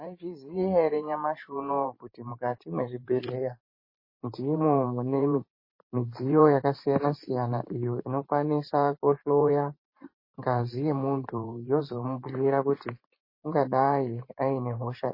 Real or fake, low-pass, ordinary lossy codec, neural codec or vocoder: fake; 5.4 kHz; MP3, 24 kbps; vocoder, 44.1 kHz, 128 mel bands every 512 samples, BigVGAN v2